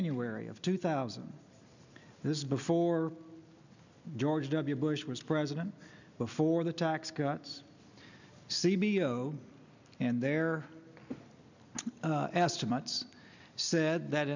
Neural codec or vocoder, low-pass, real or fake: none; 7.2 kHz; real